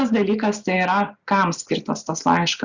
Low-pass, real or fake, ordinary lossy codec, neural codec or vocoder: 7.2 kHz; real; Opus, 64 kbps; none